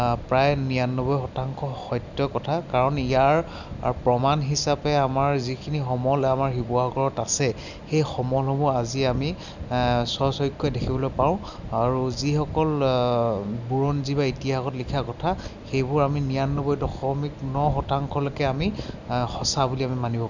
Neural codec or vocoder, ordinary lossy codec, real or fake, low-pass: none; none; real; 7.2 kHz